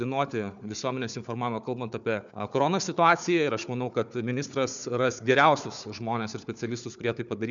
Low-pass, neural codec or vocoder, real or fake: 7.2 kHz; codec, 16 kHz, 4 kbps, FunCodec, trained on Chinese and English, 50 frames a second; fake